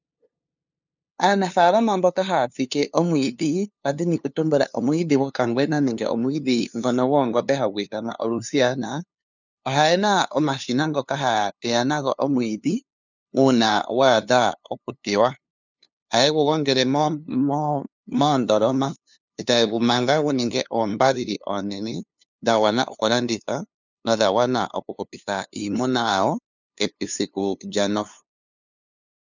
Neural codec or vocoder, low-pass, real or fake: codec, 16 kHz, 2 kbps, FunCodec, trained on LibriTTS, 25 frames a second; 7.2 kHz; fake